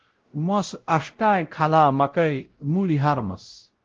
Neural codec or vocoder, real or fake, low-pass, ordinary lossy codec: codec, 16 kHz, 0.5 kbps, X-Codec, WavLM features, trained on Multilingual LibriSpeech; fake; 7.2 kHz; Opus, 16 kbps